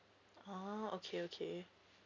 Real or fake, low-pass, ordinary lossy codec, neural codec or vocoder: real; 7.2 kHz; none; none